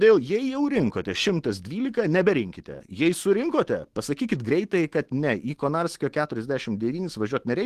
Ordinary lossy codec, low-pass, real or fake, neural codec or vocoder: Opus, 16 kbps; 14.4 kHz; fake; autoencoder, 48 kHz, 128 numbers a frame, DAC-VAE, trained on Japanese speech